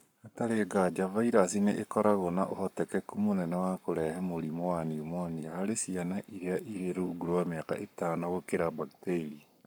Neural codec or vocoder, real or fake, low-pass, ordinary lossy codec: codec, 44.1 kHz, 7.8 kbps, Pupu-Codec; fake; none; none